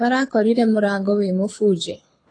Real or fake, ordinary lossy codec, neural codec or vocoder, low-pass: fake; AAC, 32 kbps; codec, 24 kHz, 6 kbps, HILCodec; 9.9 kHz